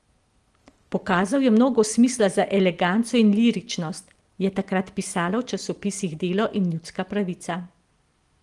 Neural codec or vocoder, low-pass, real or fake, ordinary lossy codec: none; 10.8 kHz; real; Opus, 24 kbps